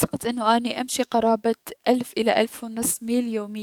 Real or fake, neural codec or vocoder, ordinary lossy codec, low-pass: fake; codec, 44.1 kHz, 7.8 kbps, DAC; none; 19.8 kHz